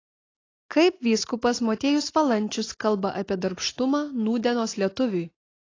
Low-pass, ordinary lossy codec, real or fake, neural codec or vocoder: 7.2 kHz; AAC, 32 kbps; real; none